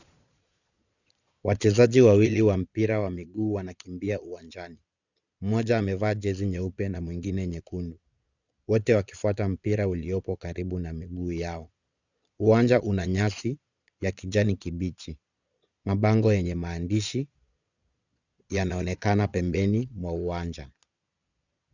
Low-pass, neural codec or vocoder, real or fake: 7.2 kHz; vocoder, 22.05 kHz, 80 mel bands, Vocos; fake